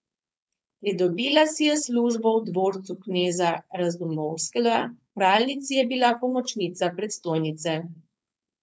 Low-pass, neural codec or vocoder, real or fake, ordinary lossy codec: none; codec, 16 kHz, 4.8 kbps, FACodec; fake; none